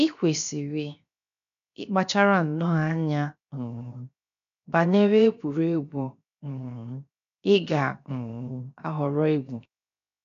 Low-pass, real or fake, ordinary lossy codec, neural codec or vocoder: 7.2 kHz; fake; none; codec, 16 kHz, 0.7 kbps, FocalCodec